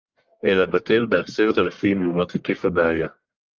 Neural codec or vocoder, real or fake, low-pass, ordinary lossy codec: codec, 44.1 kHz, 1.7 kbps, Pupu-Codec; fake; 7.2 kHz; Opus, 32 kbps